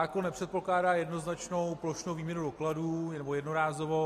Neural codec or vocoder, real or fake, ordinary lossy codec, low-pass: none; real; AAC, 48 kbps; 14.4 kHz